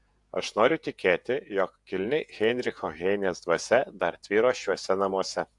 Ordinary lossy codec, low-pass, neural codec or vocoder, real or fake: AAC, 64 kbps; 10.8 kHz; vocoder, 48 kHz, 128 mel bands, Vocos; fake